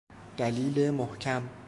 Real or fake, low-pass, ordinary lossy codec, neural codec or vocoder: fake; 10.8 kHz; MP3, 64 kbps; autoencoder, 48 kHz, 128 numbers a frame, DAC-VAE, trained on Japanese speech